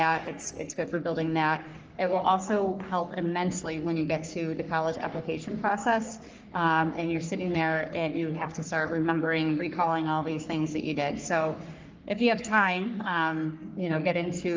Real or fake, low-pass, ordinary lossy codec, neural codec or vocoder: fake; 7.2 kHz; Opus, 24 kbps; codec, 44.1 kHz, 3.4 kbps, Pupu-Codec